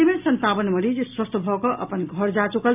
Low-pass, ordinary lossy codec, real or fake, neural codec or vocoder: 3.6 kHz; AAC, 32 kbps; real; none